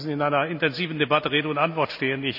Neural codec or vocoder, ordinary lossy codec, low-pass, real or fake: none; none; 5.4 kHz; real